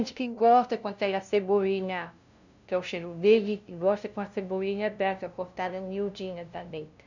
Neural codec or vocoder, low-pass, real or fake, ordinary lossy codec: codec, 16 kHz, 0.5 kbps, FunCodec, trained on LibriTTS, 25 frames a second; 7.2 kHz; fake; none